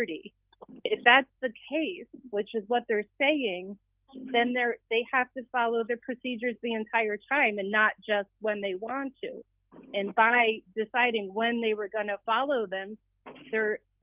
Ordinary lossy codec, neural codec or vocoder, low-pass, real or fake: Opus, 24 kbps; none; 3.6 kHz; real